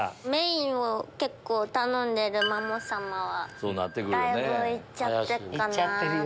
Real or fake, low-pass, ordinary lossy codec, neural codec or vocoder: real; none; none; none